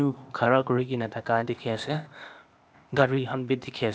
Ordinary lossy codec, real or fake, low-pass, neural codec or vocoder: none; fake; none; codec, 16 kHz, 0.8 kbps, ZipCodec